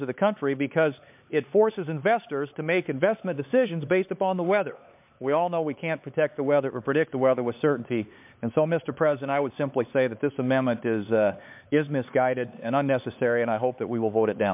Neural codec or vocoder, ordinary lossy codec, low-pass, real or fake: codec, 16 kHz, 4 kbps, X-Codec, HuBERT features, trained on LibriSpeech; MP3, 32 kbps; 3.6 kHz; fake